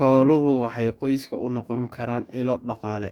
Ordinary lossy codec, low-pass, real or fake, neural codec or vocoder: none; 19.8 kHz; fake; codec, 44.1 kHz, 2.6 kbps, DAC